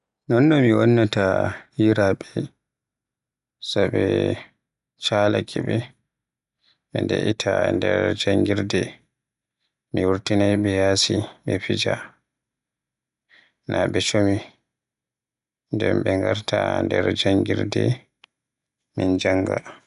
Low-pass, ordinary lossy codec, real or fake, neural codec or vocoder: 10.8 kHz; none; real; none